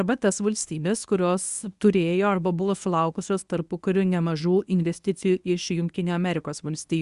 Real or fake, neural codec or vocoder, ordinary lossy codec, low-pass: fake; codec, 24 kHz, 0.9 kbps, WavTokenizer, medium speech release version 1; Opus, 64 kbps; 10.8 kHz